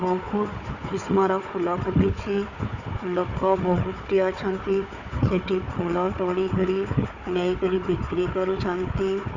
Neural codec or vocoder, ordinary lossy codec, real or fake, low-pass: codec, 16 kHz, 4 kbps, FunCodec, trained on Chinese and English, 50 frames a second; none; fake; 7.2 kHz